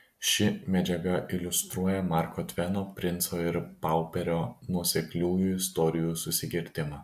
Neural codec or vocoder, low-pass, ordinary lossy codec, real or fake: none; 14.4 kHz; AAC, 96 kbps; real